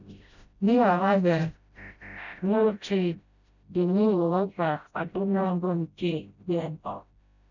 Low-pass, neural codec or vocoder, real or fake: 7.2 kHz; codec, 16 kHz, 0.5 kbps, FreqCodec, smaller model; fake